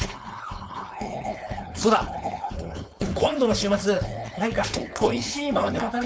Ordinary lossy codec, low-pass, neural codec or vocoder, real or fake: none; none; codec, 16 kHz, 4.8 kbps, FACodec; fake